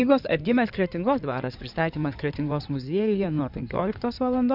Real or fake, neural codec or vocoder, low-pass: fake; codec, 16 kHz in and 24 kHz out, 2.2 kbps, FireRedTTS-2 codec; 5.4 kHz